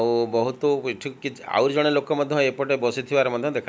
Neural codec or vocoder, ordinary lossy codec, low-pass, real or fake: none; none; none; real